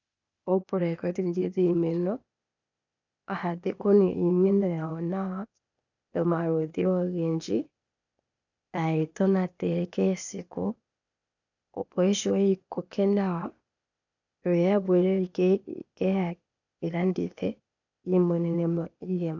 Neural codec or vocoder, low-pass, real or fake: codec, 16 kHz, 0.8 kbps, ZipCodec; 7.2 kHz; fake